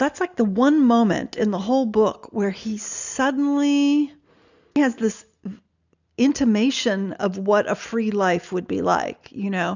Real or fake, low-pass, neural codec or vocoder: real; 7.2 kHz; none